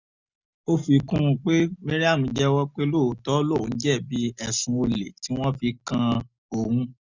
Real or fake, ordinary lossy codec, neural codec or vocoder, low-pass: real; none; none; 7.2 kHz